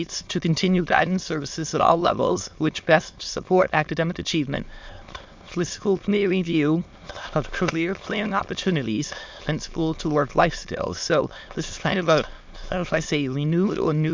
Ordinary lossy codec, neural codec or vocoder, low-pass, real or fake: MP3, 64 kbps; autoencoder, 22.05 kHz, a latent of 192 numbers a frame, VITS, trained on many speakers; 7.2 kHz; fake